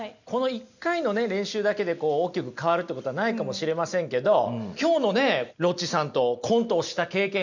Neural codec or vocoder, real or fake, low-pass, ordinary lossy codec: none; real; 7.2 kHz; none